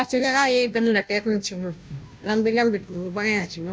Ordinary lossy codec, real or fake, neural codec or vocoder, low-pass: none; fake; codec, 16 kHz, 0.5 kbps, FunCodec, trained on Chinese and English, 25 frames a second; none